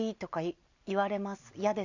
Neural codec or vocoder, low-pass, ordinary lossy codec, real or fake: none; 7.2 kHz; none; real